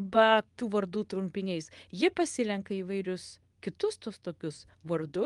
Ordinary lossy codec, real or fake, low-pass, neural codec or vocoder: Opus, 32 kbps; fake; 10.8 kHz; codec, 24 kHz, 0.9 kbps, WavTokenizer, medium speech release version 2